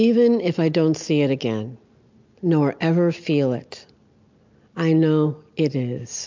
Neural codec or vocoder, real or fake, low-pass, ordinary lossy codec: none; real; 7.2 kHz; MP3, 64 kbps